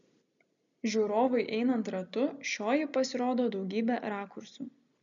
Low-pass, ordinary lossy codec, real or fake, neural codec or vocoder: 7.2 kHz; MP3, 96 kbps; real; none